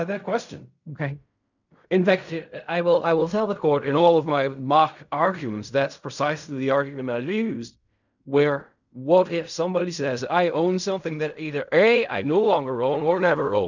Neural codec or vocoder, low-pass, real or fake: codec, 16 kHz in and 24 kHz out, 0.4 kbps, LongCat-Audio-Codec, fine tuned four codebook decoder; 7.2 kHz; fake